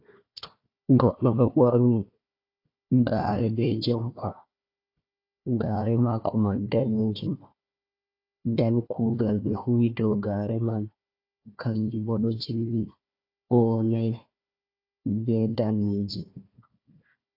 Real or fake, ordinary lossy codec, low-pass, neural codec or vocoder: fake; AAC, 32 kbps; 5.4 kHz; codec, 16 kHz, 1 kbps, FunCodec, trained on Chinese and English, 50 frames a second